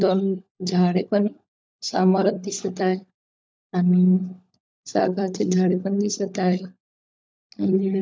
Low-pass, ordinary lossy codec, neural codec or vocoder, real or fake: none; none; codec, 16 kHz, 16 kbps, FunCodec, trained on LibriTTS, 50 frames a second; fake